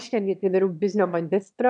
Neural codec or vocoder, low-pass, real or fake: autoencoder, 22.05 kHz, a latent of 192 numbers a frame, VITS, trained on one speaker; 9.9 kHz; fake